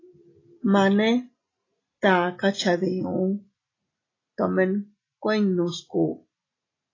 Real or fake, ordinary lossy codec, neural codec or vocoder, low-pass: real; AAC, 32 kbps; none; 7.2 kHz